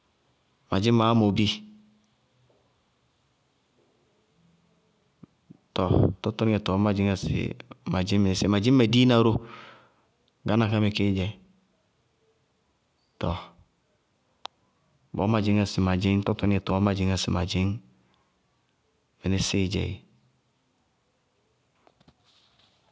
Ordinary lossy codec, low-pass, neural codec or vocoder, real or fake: none; none; none; real